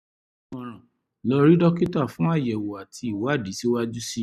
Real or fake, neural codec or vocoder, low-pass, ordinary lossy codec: real; none; 14.4 kHz; none